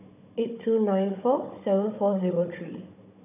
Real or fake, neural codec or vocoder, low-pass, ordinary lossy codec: fake; codec, 16 kHz, 16 kbps, FunCodec, trained on Chinese and English, 50 frames a second; 3.6 kHz; none